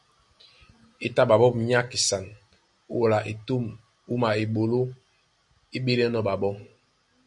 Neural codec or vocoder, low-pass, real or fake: none; 10.8 kHz; real